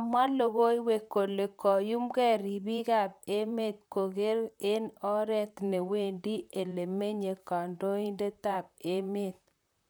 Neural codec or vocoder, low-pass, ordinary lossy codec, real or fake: vocoder, 44.1 kHz, 128 mel bands, Pupu-Vocoder; none; none; fake